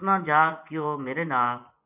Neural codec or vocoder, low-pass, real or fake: vocoder, 24 kHz, 100 mel bands, Vocos; 3.6 kHz; fake